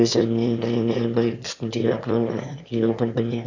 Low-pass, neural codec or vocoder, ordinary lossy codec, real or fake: 7.2 kHz; autoencoder, 22.05 kHz, a latent of 192 numbers a frame, VITS, trained on one speaker; none; fake